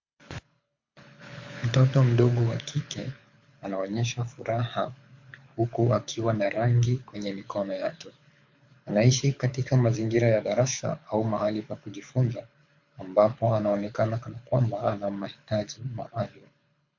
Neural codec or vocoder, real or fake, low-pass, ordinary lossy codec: codec, 24 kHz, 6 kbps, HILCodec; fake; 7.2 kHz; MP3, 48 kbps